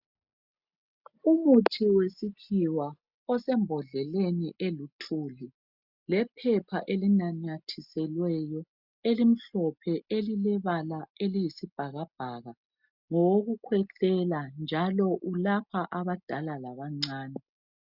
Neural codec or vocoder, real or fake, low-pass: none; real; 5.4 kHz